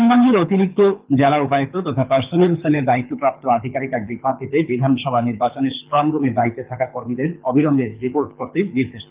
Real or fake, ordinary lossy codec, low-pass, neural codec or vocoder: fake; Opus, 32 kbps; 3.6 kHz; codec, 24 kHz, 6 kbps, HILCodec